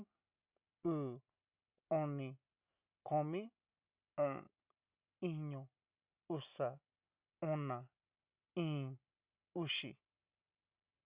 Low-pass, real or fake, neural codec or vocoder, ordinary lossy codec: 3.6 kHz; real; none; Opus, 64 kbps